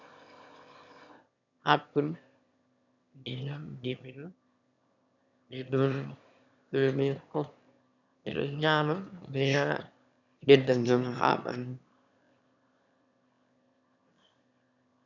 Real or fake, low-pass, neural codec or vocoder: fake; 7.2 kHz; autoencoder, 22.05 kHz, a latent of 192 numbers a frame, VITS, trained on one speaker